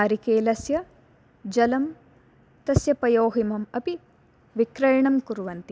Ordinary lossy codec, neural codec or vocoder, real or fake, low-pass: none; none; real; none